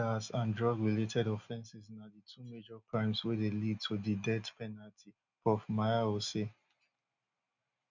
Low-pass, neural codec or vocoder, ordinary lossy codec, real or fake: 7.2 kHz; none; none; real